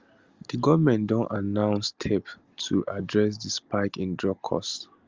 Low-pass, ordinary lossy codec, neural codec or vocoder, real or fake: 7.2 kHz; Opus, 32 kbps; none; real